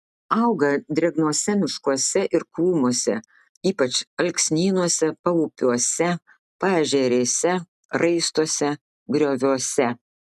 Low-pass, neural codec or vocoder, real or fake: 14.4 kHz; none; real